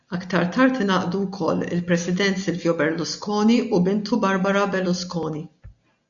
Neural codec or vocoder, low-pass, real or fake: none; 7.2 kHz; real